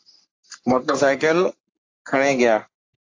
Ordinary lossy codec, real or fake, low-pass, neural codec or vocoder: AAC, 48 kbps; fake; 7.2 kHz; codec, 44.1 kHz, 3.4 kbps, Pupu-Codec